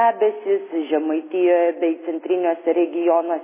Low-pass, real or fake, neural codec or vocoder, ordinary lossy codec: 3.6 kHz; real; none; MP3, 16 kbps